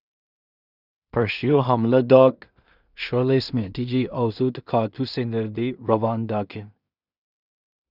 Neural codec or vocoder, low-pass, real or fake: codec, 16 kHz in and 24 kHz out, 0.4 kbps, LongCat-Audio-Codec, two codebook decoder; 5.4 kHz; fake